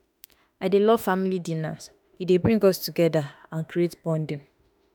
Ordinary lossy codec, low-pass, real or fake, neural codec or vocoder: none; none; fake; autoencoder, 48 kHz, 32 numbers a frame, DAC-VAE, trained on Japanese speech